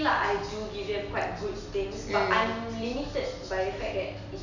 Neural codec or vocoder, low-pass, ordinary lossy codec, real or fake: none; 7.2 kHz; none; real